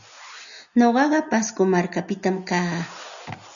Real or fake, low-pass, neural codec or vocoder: real; 7.2 kHz; none